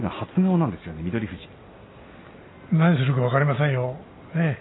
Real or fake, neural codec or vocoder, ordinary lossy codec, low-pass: real; none; AAC, 16 kbps; 7.2 kHz